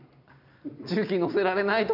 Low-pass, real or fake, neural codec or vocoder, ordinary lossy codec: 5.4 kHz; real; none; none